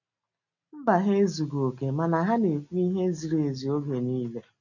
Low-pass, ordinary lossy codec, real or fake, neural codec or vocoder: 7.2 kHz; none; real; none